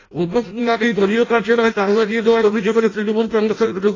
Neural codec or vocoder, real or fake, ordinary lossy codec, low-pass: codec, 16 kHz in and 24 kHz out, 0.6 kbps, FireRedTTS-2 codec; fake; AAC, 32 kbps; 7.2 kHz